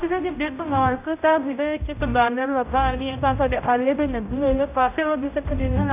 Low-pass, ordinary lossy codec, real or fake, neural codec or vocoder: 3.6 kHz; none; fake; codec, 16 kHz, 0.5 kbps, X-Codec, HuBERT features, trained on general audio